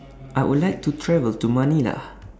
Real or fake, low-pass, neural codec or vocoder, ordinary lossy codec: real; none; none; none